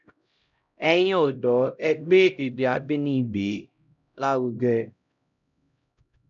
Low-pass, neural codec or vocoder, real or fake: 7.2 kHz; codec, 16 kHz, 0.5 kbps, X-Codec, HuBERT features, trained on LibriSpeech; fake